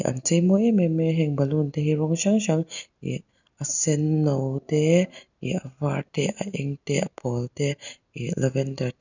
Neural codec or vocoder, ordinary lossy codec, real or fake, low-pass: none; none; real; 7.2 kHz